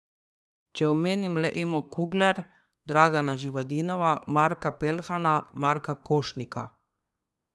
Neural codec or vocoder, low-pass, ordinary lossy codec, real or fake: codec, 24 kHz, 1 kbps, SNAC; none; none; fake